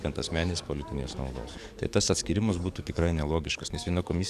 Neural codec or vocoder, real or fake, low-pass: codec, 44.1 kHz, 7.8 kbps, DAC; fake; 14.4 kHz